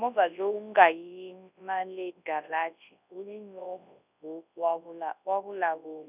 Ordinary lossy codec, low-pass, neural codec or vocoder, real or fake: none; 3.6 kHz; codec, 24 kHz, 0.9 kbps, WavTokenizer, large speech release; fake